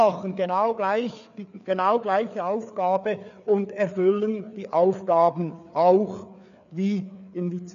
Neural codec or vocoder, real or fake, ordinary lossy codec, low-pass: codec, 16 kHz, 4 kbps, FreqCodec, larger model; fake; none; 7.2 kHz